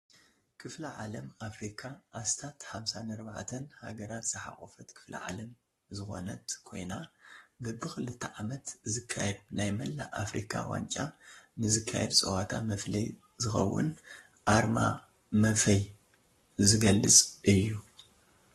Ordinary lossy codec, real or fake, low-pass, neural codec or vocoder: AAC, 32 kbps; fake; 19.8 kHz; codec, 44.1 kHz, 7.8 kbps, Pupu-Codec